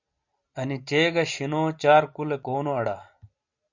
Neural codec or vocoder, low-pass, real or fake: none; 7.2 kHz; real